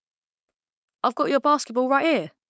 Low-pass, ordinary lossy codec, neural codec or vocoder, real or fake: none; none; codec, 16 kHz, 4.8 kbps, FACodec; fake